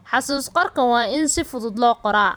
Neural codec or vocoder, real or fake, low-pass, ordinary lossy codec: vocoder, 44.1 kHz, 128 mel bands every 512 samples, BigVGAN v2; fake; none; none